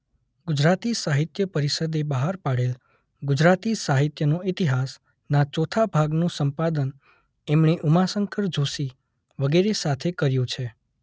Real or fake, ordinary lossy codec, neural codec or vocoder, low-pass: real; none; none; none